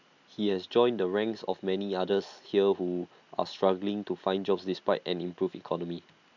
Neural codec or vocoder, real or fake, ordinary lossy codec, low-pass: none; real; none; 7.2 kHz